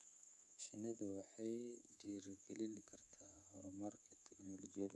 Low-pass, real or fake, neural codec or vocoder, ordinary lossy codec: none; fake; codec, 24 kHz, 3.1 kbps, DualCodec; none